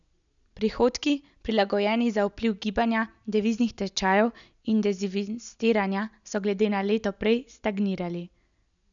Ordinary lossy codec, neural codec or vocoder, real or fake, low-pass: none; none; real; 7.2 kHz